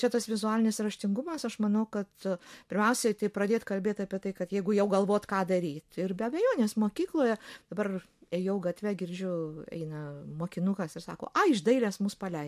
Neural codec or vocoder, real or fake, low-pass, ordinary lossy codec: none; real; 14.4 kHz; MP3, 64 kbps